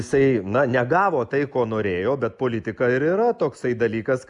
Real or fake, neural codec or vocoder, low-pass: real; none; 10.8 kHz